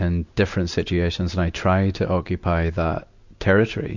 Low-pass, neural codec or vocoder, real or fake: 7.2 kHz; none; real